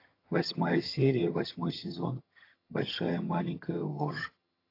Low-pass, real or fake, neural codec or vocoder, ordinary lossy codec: 5.4 kHz; fake; vocoder, 22.05 kHz, 80 mel bands, HiFi-GAN; AAC, 32 kbps